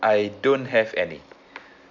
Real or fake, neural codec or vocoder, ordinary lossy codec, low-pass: real; none; none; 7.2 kHz